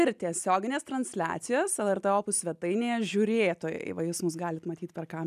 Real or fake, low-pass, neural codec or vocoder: real; 14.4 kHz; none